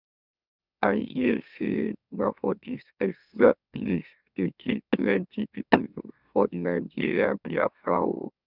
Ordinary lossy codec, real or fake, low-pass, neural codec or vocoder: none; fake; 5.4 kHz; autoencoder, 44.1 kHz, a latent of 192 numbers a frame, MeloTTS